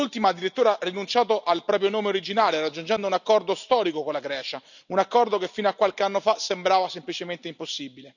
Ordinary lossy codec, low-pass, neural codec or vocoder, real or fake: none; 7.2 kHz; none; real